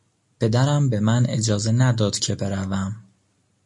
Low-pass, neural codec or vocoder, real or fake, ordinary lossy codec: 10.8 kHz; none; real; MP3, 48 kbps